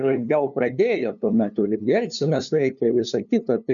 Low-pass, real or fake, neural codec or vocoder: 7.2 kHz; fake; codec, 16 kHz, 2 kbps, FunCodec, trained on LibriTTS, 25 frames a second